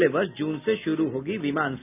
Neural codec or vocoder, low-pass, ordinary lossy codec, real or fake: none; 3.6 kHz; none; real